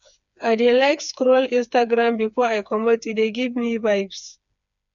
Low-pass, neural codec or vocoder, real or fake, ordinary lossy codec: 7.2 kHz; codec, 16 kHz, 4 kbps, FreqCodec, smaller model; fake; none